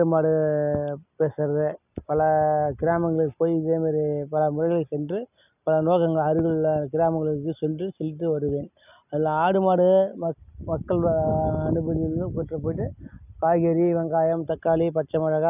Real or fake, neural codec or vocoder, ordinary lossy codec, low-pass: real; none; none; 3.6 kHz